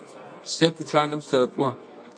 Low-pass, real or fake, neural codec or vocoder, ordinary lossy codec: 9.9 kHz; fake; codec, 24 kHz, 0.9 kbps, WavTokenizer, medium music audio release; MP3, 48 kbps